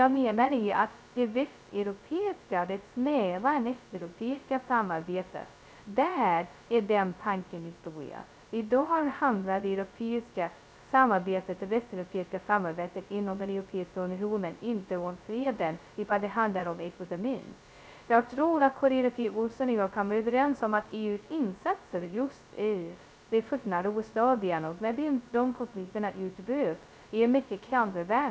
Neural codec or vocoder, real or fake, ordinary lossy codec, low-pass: codec, 16 kHz, 0.2 kbps, FocalCodec; fake; none; none